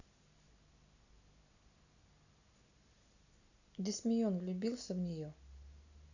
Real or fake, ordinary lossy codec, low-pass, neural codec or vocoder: real; none; 7.2 kHz; none